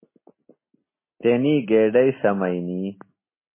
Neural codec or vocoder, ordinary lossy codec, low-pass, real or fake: none; MP3, 16 kbps; 3.6 kHz; real